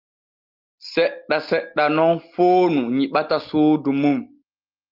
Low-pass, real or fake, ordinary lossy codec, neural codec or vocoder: 5.4 kHz; real; Opus, 32 kbps; none